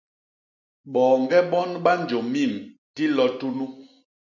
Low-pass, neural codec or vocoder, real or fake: 7.2 kHz; none; real